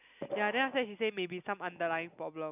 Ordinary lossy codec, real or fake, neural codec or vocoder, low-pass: none; real; none; 3.6 kHz